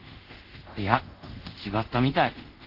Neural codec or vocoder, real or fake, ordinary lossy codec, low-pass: codec, 24 kHz, 0.5 kbps, DualCodec; fake; Opus, 16 kbps; 5.4 kHz